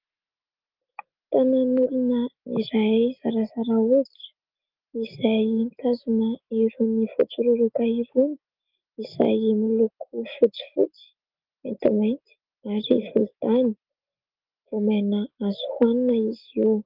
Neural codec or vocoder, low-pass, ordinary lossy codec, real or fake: none; 5.4 kHz; Opus, 32 kbps; real